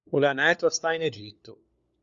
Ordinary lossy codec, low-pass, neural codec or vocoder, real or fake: Opus, 64 kbps; 7.2 kHz; codec, 16 kHz, 4 kbps, FunCodec, trained on LibriTTS, 50 frames a second; fake